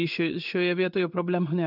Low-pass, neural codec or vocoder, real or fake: 5.4 kHz; codec, 16 kHz in and 24 kHz out, 1 kbps, XY-Tokenizer; fake